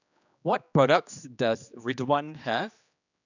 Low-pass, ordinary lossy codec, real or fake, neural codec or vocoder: 7.2 kHz; none; fake; codec, 16 kHz, 2 kbps, X-Codec, HuBERT features, trained on general audio